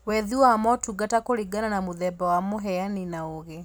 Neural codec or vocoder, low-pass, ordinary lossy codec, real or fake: none; none; none; real